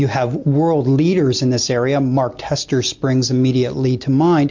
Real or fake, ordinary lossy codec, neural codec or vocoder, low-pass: real; MP3, 48 kbps; none; 7.2 kHz